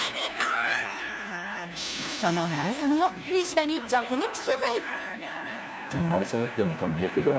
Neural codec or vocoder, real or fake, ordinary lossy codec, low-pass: codec, 16 kHz, 1 kbps, FunCodec, trained on LibriTTS, 50 frames a second; fake; none; none